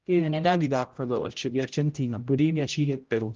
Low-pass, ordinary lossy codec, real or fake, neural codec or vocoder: 7.2 kHz; Opus, 32 kbps; fake; codec, 16 kHz, 0.5 kbps, X-Codec, HuBERT features, trained on general audio